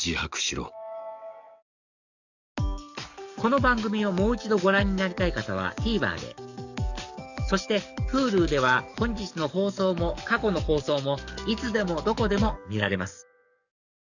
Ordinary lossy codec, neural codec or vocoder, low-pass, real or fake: none; codec, 44.1 kHz, 7.8 kbps, DAC; 7.2 kHz; fake